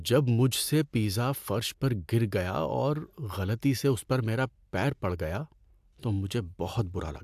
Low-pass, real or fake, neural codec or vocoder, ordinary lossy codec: 14.4 kHz; real; none; AAC, 96 kbps